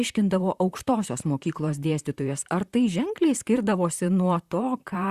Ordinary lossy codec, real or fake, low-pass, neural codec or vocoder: Opus, 64 kbps; fake; 14.4 kHz; vocoder, 44.1 kHz, 128 mel bands, Pupu-Vocoder